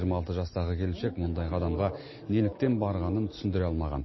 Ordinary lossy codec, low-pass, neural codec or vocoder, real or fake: MP3, 24 kbps; 7.2 kHz; none; real